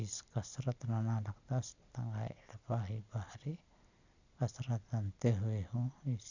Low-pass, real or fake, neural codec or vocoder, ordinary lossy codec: 7.2 kHz; real; none; none